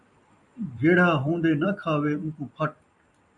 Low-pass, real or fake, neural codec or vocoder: 10.8 kHz; fake; vocoder, 44.1 kHz, 128 mel bands every 256 samples, BigVGAN v2